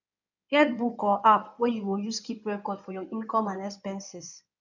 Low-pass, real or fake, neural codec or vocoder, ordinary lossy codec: 7.2 kHz; fake; codec, 16 kHz in and 24 kHz out, 2.2 kbps, FireRedTTS-2 codec; none